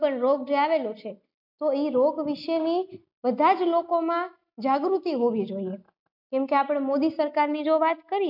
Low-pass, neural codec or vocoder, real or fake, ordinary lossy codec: 5.4 kHz; none; real; MP3, 48 kbps